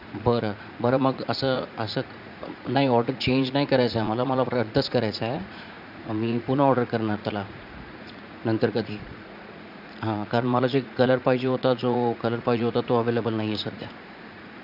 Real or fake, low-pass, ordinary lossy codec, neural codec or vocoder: fake; 5.4 kHz; none; vocoder, 44.1 kHz, 80 mel bands, Vocos